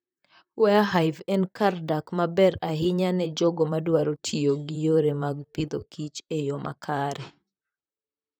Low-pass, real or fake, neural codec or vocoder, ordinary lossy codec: none; fake; vocoder, 44.1 kHz, 128 mel bands, Pupu-Vocoder; none